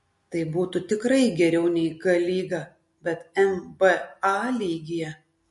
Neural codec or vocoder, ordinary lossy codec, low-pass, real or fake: none; MP3, 48 kbps; 14.4 kHz; real